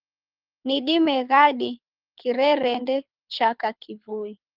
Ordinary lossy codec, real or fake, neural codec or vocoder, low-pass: Opus, 24 kbps; fake; codec, 24 kHz, 6 kbps, HILCodec; 5.4 kHz